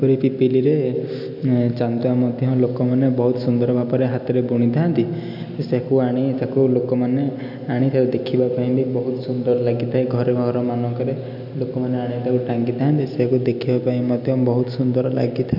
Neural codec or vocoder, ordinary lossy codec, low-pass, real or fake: none; none; 5.4 kHz; real